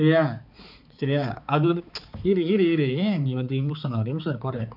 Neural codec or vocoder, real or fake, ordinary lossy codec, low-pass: codec, 16 kHz, 4 kbps, X-Codec, HuBERT features, trained on balanced general audio; fake; none; 5.4 kHz